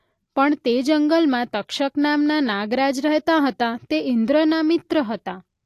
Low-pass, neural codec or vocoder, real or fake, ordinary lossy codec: 14.4 kHz; none; real; AAC, 64 kbps